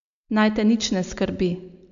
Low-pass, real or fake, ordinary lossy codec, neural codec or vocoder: 7.2 kHz; real; none; none